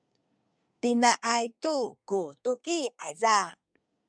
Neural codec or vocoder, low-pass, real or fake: codec, 24 kHz, 1 kbps, SNAC; 9.9 kHz; fake